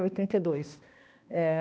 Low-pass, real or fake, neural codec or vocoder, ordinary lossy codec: none; fake; codec, 16 kHz, 1 kbps, X-Codec, HuBERT features, trained on balanced general audio; none